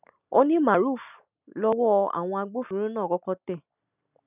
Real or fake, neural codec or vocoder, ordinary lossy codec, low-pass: real; none; none; 3.6 kHz